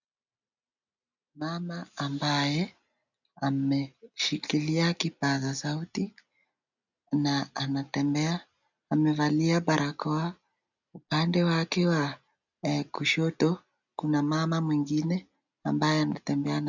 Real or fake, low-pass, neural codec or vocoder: real; 7.2 kHz; none